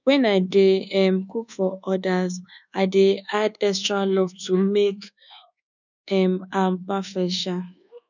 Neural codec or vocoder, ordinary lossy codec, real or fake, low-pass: codec, 24 kHz, 1.2 kbps, DualCodec; none; fake; 7.2 kHz